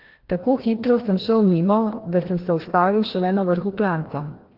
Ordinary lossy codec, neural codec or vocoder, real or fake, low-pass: Opus, 16 kbps; codec, 16 kHz, 1 kbps, FreqCodec, larger model; fake; 5.4 kHz